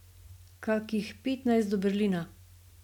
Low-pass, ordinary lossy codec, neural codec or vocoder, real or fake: 19.8 kHz; none; none; real